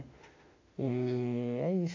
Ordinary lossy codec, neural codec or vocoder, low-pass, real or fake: AAC, 48 kbps; autoencoder, 48 kHz, 32 numbers a frame, DAC-VAE, trained on Japanese speech; 7.2 kHz; fake